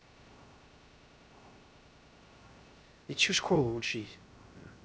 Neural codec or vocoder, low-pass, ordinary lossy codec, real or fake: codec, 16 kHz, 0.3 kbps, FocalCodec; none; none; fake